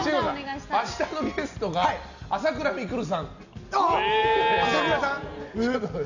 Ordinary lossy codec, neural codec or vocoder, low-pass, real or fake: none; none; 7.2 kHz; real